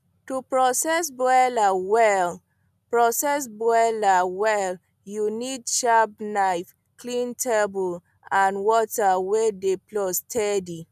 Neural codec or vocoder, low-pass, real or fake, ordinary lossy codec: none; 14.4 kHz; real; none